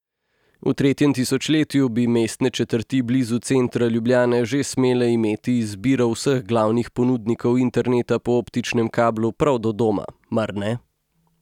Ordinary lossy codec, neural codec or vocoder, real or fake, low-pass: none; none; real; 19.8 kHz